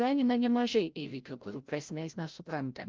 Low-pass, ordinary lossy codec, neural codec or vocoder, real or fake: 7.2 kHz; Opus, 32 kbps; codec, 16 kHz, 0.5 kbps, FreqCodec, larger model; fake